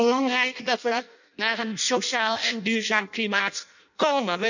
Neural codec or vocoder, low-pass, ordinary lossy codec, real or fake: codec, 16 kHz in and 24 kHz out, 0.6 kbps, FireRedTTS-2 codec; 7.2 kHz; none; fake